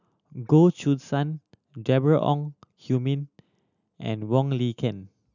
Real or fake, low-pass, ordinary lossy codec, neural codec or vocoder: real; 7.2 kHz; none; none